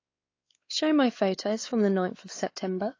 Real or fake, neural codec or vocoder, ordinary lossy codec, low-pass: fake; codec, 16 kHz, 4 kbps, X-Codec, WavLM features, trained on Multilingual LibriSpeech; AAC, 32 kbps; 7.2 kHz